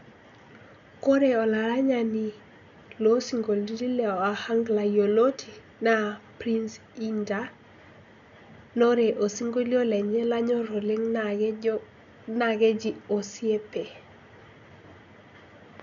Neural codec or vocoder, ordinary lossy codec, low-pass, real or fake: none; none; 7.2 kHz; real